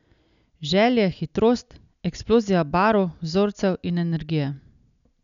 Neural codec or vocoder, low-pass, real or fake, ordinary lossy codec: none; 7.2 kHz; real; none